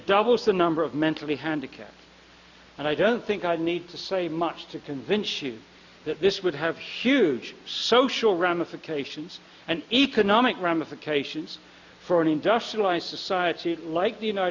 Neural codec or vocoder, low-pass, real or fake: none; 7.2 kHz; real